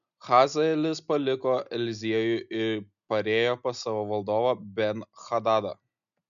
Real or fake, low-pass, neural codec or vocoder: real; 7.2 kHz; none